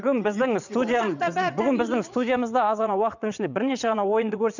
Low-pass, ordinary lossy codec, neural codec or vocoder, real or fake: 7.2 kHz; none; vocoder, 22.05 kHz, 80 mel bands, WaveNeXt; fake